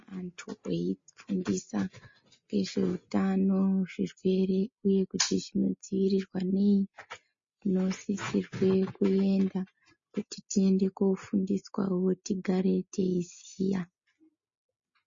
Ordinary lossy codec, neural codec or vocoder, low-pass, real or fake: MP3, 32 kbps; none; 7.2 kHz; real